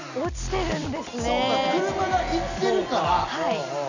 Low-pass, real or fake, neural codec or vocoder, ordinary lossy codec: 7.2 kHz; real; none; none